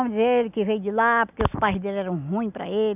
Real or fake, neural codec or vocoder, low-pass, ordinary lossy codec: real; none; 3.6 kHz; none